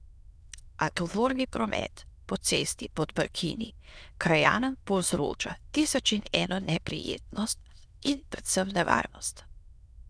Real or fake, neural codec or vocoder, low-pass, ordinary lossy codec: fake; autoencoder, 22.05 kHz, a latent of 192 numbers a frame, VITS, trained on many speakers; none; none